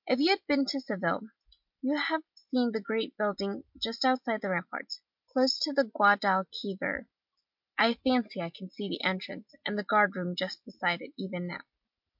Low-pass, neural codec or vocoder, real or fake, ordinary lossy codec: 5.4 kHz; none; real; MP3, 48 kbps